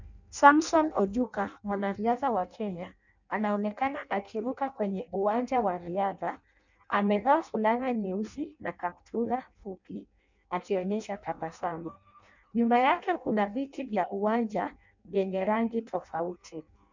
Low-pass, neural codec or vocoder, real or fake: 7.2 kHz; codec, 16 kHz in and 24 kHz out, 0.6 kbps, FireRedTTS-2 codec; fake